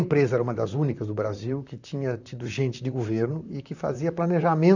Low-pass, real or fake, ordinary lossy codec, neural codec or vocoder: 7.2 kHz; fake; none; vocoder, 44.1 kHz, 128 mel bands, Pupu-Vocoder